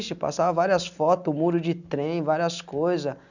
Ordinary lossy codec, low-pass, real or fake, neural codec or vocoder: none; 7.2 kHz; real; none